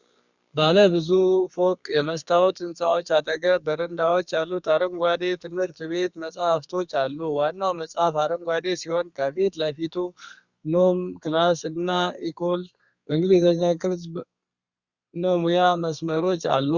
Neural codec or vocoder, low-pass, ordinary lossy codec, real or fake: codec, 32 kHz, 1.9 kbps, SNAC; 7.2 kHz; Opus, 64 kbps; fake